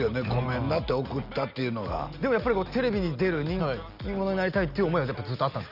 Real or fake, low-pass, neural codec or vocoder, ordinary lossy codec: real; 5.4 kHz; none; none